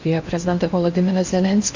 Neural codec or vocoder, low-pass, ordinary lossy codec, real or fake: codec, 16 kHz in and 24 kHz out, 0.8 kbps, FocalCodec, streaming, 65536 codes; 7.2 kHz; Opus, 64 kbps; fake